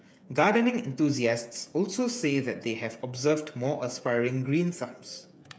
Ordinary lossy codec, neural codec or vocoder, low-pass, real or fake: none; codec, 16 kHz, 8 kbps, FreqCodec, smaller model; none; fake